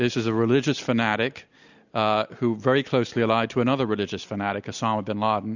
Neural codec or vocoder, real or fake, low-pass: none; real; 7.2 kHz